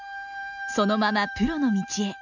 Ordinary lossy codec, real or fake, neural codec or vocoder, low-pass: none; real; none; 7.2 kHz